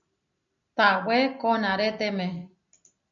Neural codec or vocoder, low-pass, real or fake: none; 7.2 kHz; real